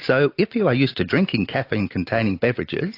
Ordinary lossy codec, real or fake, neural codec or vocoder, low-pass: AAC, 32 kbps; real; none; 5.4 kHz